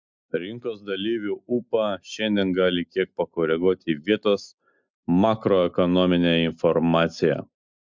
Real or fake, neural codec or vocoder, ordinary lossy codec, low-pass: real; none; MP3, 64 kbps; 7.2 kHz